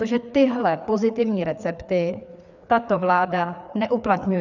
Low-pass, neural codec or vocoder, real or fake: 7.2 kHz; codec, 16 kHz, 4 kbps, FreqCodec, larger model; fake